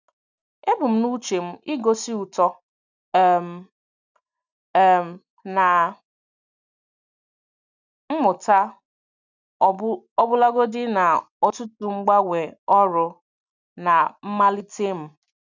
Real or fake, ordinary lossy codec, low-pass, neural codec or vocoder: real; none; 7.2 kHz; none